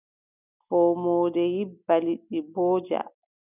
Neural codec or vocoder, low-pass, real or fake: none; 3.6 kHz; real